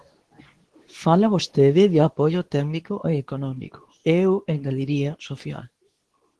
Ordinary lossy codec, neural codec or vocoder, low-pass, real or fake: Opus, 16 kbps; codec, 24 kHz, 0.9 kbps, WavTokenizer, medium speech release version 2; 10.8 kHz; fake